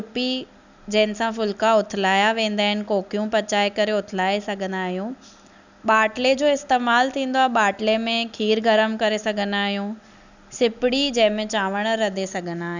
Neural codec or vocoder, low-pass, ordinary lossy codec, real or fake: none; 7.2 kHz; none; real